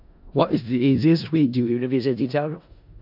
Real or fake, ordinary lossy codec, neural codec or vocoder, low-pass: fake; AAC, 48 kbps; codec, 16 kHz in and 24 kHz out, 0.4 kbps, LongCat-Audio-Codec, four codebook decoder; 5.4 kHz